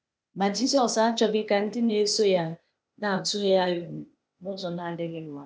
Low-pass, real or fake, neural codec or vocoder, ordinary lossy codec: none; fake; codec, 16 kHz, 0.8 kbps, ZipCodec; none